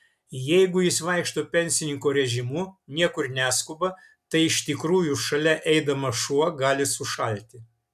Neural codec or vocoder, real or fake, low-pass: none; real; 14.4 kHz